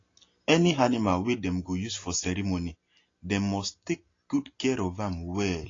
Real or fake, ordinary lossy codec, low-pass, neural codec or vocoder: real; AAC, 32 kbps; 7.2 kHz; none